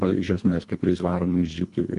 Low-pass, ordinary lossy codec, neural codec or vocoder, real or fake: 10.8 kHz; AAC, 64 kbps; codec, 24 kHz, 1.5 kbps, HILCodec; fake